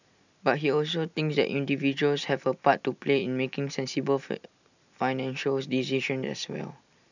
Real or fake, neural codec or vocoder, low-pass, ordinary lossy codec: real; none; 7.2 kHz; none